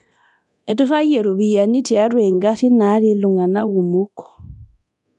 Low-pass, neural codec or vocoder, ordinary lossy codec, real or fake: 10.8 kHz; codec, 24 kHz, 0.9 kbps, DualCodec; none; fake